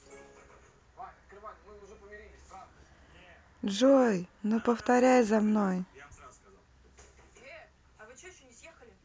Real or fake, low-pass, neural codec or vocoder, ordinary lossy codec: real; none; none; none